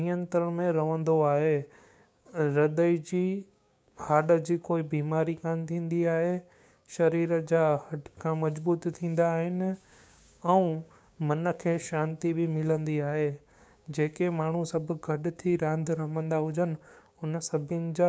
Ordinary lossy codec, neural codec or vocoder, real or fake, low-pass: none; codec, 16 kHz, 6 kbps, DAC; fake; none